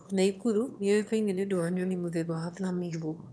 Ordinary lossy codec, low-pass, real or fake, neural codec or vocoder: none; none; fake; autoencoder, 22.05 kHz, a latent of 192 numbers a frame, VITS, trained on one speaker